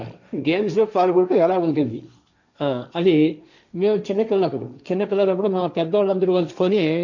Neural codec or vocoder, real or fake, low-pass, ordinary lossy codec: codec, 16 kHz, 1.1 kbps, Voila-Tokenizer; fake; 7.2 kHz; none